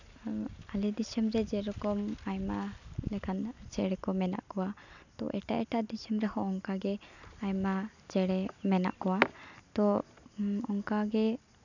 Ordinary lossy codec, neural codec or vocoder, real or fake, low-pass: none; none; real; 7.2 kHz